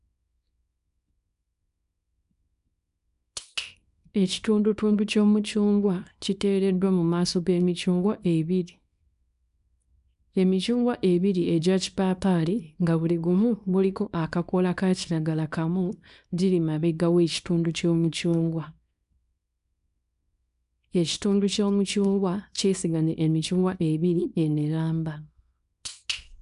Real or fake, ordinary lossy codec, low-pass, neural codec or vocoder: fake; none; 10.8 kHz; codec, 24 kHz, 0.9 kbps, WavTokenizer, small release